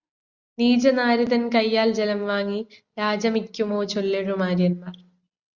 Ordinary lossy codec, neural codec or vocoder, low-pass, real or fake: Opus, 64 kbps; none; 7.2 kHz; real